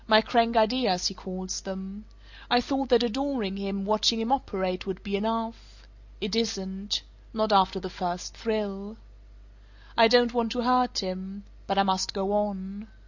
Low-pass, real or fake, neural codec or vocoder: 7.2 kHz; real; none